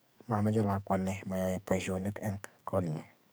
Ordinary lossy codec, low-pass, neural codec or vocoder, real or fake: none; none; codec, 44.1 kHz, 2.6 kbps, SNAC; fake